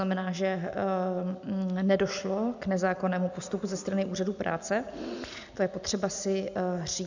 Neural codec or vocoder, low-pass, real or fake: none; 7.2 kHz; real